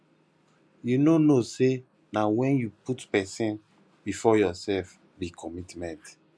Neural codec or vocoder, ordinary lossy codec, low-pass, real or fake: none; none; none; real